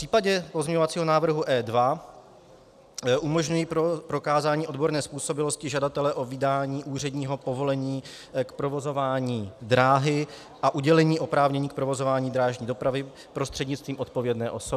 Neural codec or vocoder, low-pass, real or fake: none; 14.4 kHz; real